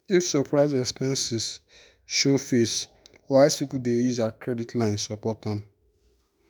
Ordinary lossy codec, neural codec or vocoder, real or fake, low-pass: none; autoencoder, 48 kHz, 32 numbers a frame, DAC-VAE, trained on Japanese speech; fake; none